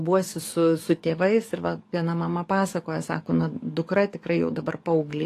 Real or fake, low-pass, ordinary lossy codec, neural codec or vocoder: fake; 14.4 kHz; AAC, 48 kbps; codec, 44.1 kHz, 7.8 kbps, DAC